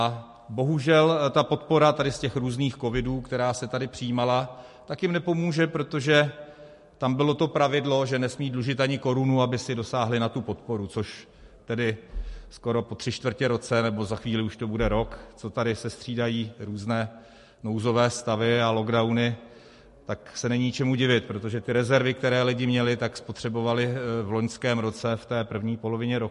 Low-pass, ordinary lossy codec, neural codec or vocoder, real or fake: 14.4 kHz; MP3, 48 kbps; none; real